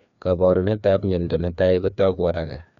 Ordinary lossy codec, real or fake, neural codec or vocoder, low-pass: none; fake; codec, 16 kHz, 2 kbps, FreqCodec, larger model; 7.2 kHz